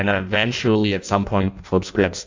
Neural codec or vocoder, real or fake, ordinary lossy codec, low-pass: codec, 16 kHz in and 24 kHz out, 0.6 kbps, FireRedTTS-2 codec; fake; MP3, 64 kbps; 7.2 kHz